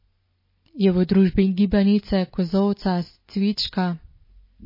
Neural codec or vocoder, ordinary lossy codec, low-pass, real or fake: none; MP3, 24 kbps; 5.4 kHz; real